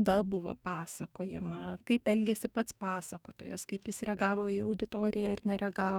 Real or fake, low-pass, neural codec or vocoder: fake; 19.8 kHz; codec, 44.1 kHz, 2.6 kbps, DAC